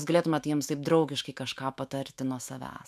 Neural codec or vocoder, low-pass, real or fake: autoencoder, 48 kHz, 128 numbers a frame, DAC-VAE, trained on Japanese speech; 14.4 kHz; fake